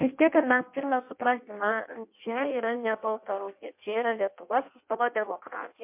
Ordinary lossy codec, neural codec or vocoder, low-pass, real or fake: MP3, 32 kbps; codec, 16 kHz in and 24 kHz out, 0.6 kbps, FireRedTTS-2 codec; 3.6 kHz; fake